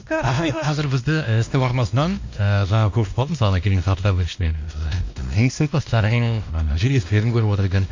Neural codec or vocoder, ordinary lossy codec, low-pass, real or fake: codec, 16 kHz, 1 kbps, X-Codec, WavLM features, trained on Multilingual LibriSpeech; MP3, 64 kbps; 7.2 kHz; fake